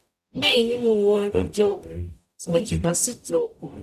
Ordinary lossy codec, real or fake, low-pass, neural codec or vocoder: none; fake; 14.4 kHz; codec, 44.1 kHz, 0.9 kbps, DAC